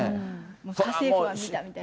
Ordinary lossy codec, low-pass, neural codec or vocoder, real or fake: none; none; none; real